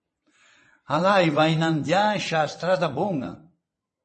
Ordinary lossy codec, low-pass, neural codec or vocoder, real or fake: MP3, 32 kbps; 9.9 kHz; vocoder, 22.05 kHz, 80 mel bands, WaveNeXt; fake